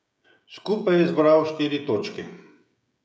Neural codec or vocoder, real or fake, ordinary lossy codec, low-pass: codec, 16 kHz, 16 kbps, FreqCodec, smaller model; fake; none; none